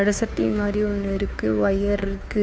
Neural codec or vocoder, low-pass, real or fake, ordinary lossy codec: codec, 16 kHz, 2 kbps, FunCodec, trained on Chinese and English, 25 frames a second; none; fake; none